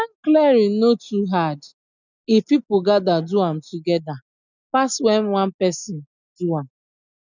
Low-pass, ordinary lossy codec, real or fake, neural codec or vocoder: 7.2 kHz; none; real; none